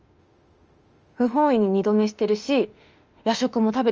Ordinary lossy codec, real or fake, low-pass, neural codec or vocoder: Opus, 24 kbps; fake; 7.2 kHz; autoencoder, 48 kHz, 32 numbers a frame, DAC-VAE, trained on Japanese speech